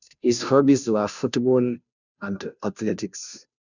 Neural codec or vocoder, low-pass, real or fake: codec, 16 kHz, 0.5 kbps, FunCodec, trained on Chinese and English, 25 frames a second; 7.2 kHz; fake